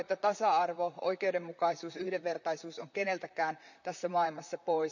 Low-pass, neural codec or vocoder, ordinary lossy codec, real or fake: 7.2 kHz; vocoder, 44.1 kHz, 128 mel bands, Pupu-Vocoder; none; fake